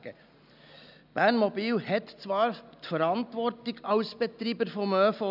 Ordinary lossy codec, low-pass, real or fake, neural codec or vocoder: none; 5.4 kHz; real; none